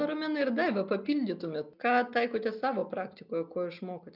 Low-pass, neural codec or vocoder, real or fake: 5.4 kHz; none; real